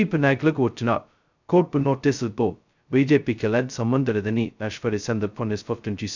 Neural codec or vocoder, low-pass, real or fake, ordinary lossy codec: codec, 16 kHz, 0.2 kbps, FocalCodec; 7.2 kHz; fake; none